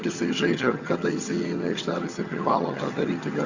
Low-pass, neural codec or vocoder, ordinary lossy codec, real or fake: 7.2 kHz; vocoder, 22.05 kHz, 80 mel bands, HiFi-GAN; Opus, 64 kbps; fake